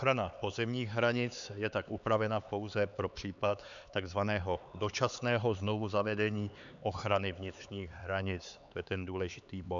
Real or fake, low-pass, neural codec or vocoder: fake; 7.2 kHz; codec, 16 kHz, 4 kbps, X-Codec, HuBERT features, trained on LibriSpeech